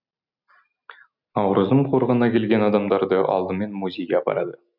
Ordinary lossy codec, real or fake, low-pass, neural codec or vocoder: none; real; 5.4 kHz; none